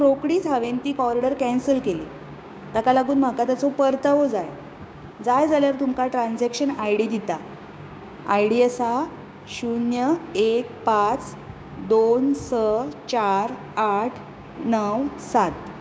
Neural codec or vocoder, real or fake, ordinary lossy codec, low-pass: codec, 16 kHz, 6 kbps, DAC; fake; none; none